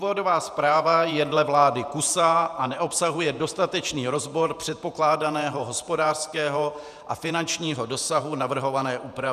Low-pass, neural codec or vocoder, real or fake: 14.4 kHz; vocoder, 48 kHz, 128 mel bands, Vocos; fake